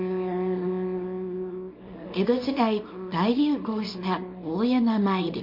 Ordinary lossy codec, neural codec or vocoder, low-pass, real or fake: MP3, 32 kbps; codec, 24 kHz, 0.9 kbps, WavTokenizer, small release; 5.4 kHz; fake